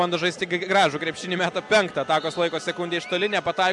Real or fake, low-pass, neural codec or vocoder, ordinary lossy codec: real; 10.8 kHz; none; MP3, 96 kbps